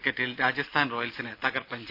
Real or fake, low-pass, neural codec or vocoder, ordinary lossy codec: real; 5.4 kHz; none; Opus, 64 kbps